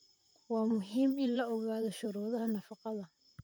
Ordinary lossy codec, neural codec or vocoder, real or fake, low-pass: none; vocoder, 44.1 kHz, 128 mel bands, Pupu-Vocoder; fake; none